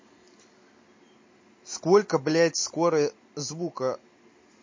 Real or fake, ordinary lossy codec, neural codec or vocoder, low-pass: real; MP3, 32 kbps; none; 7.2 kHz